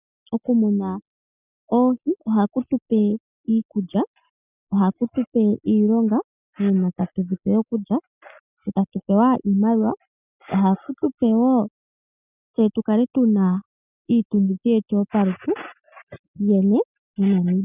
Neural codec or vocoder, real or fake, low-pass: none; real; 3.6 kHz